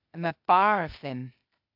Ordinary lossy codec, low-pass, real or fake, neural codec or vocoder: AAC, 48 kbps; 5.4 kHz; fake; codec, 16 kHz, 0.8 kbps, ZipCodec